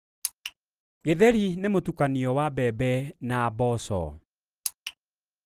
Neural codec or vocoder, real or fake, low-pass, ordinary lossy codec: none; real; 14.4 kHz; Opus, 24 kbps